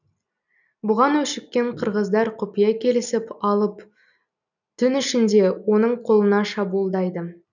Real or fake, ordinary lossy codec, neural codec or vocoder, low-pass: real; none; none; 7.2 kHz